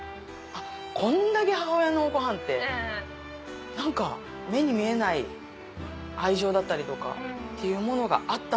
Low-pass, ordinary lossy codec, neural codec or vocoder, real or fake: none; none; none; real